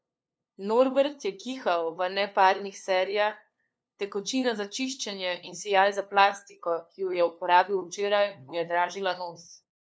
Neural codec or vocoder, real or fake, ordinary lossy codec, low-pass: codec, 16 kHz, 2 kbps, FunCodec, trained on LibriTTS, 25 frames a second; fake; none; none